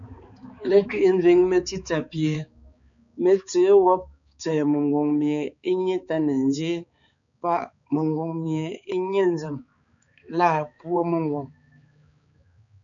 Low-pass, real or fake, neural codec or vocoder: 7.2 kHz; fake; codec, 16 kHz, 4 kbps, X-Codec, HuBERT features, trained on balanced general audio